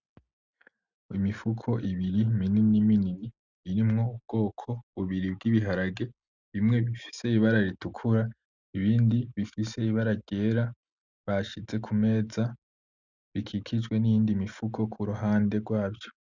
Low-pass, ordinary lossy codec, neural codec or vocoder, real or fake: 7.2 kHz; Opus, 64 kbps; none; real